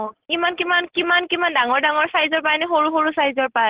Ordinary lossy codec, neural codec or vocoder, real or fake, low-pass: Opus, 16 kbps; none; real; 3.6 kHz